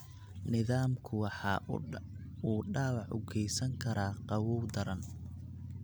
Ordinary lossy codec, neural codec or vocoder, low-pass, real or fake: none; none; none; real